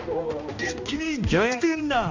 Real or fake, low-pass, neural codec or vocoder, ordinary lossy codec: fake; 7.2 kHz; codec, 16 kHz, 1 kbps, X-Codec, HuBERT features, trained on balanced general audio; AAC, 48 kbps